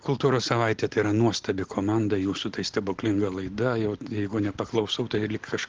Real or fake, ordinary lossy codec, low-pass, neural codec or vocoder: real; Opus, 16 kbps; 7.2 kHz; none